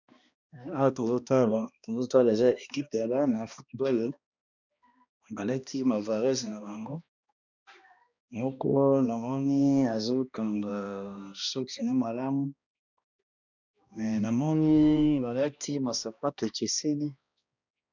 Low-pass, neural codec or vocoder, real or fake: 7.2 kHz; codec, 16 kHz, 1 kbps, X-Codec, HuBERT features, trained on balanced general audio; fake